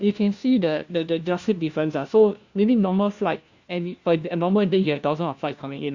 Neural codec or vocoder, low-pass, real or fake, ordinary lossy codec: codec, 16 kHz, 1 kbps, FunCodec, trained on LibriTTS, 50 frames a second; 7.2 kHz; fake; Opus, 64 kbps